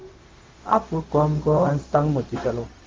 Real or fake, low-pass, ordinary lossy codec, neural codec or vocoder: fake; 7.2 kHz; Opus, 16 kbps; codec, 16 kHz, 0.4 kbps, LongCat-Audio-Codec